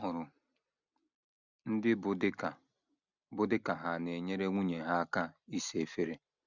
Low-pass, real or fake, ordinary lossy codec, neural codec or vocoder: 7.2 kHz; real; none; none